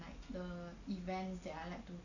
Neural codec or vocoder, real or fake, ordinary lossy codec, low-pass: none; real; none; 7.2 kHz